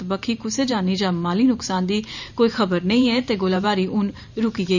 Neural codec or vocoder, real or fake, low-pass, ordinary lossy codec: vocoder, 44.1 kHz, 128 mel bands every 512 samples, BigVGAN v2; fake; 7.2 kHz; none